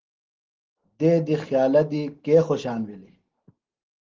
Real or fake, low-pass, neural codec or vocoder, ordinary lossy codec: real; 7.2 kHz; none; Opus, 16 kbps